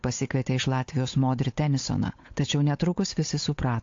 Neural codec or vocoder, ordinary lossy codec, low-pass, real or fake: codec, 16 kHz, 8 kbps, FunCodec, trained on Chinese and English, 25 frames a second; AAC, 48 kbps; 7.2 kHz; fake